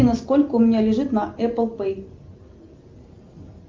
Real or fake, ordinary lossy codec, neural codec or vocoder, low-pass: real; Opus, 32 kbps; none; 7.2 kHz